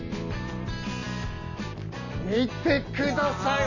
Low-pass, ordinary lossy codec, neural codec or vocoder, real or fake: 7.2 kHz; none; none; real